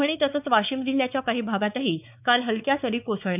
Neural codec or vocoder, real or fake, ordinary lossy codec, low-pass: codec, 16 kHz, 4 kbps, X-Codec, WavLM features, trained on Multilingual LibriSpeech; fake; none; 3.6 kHz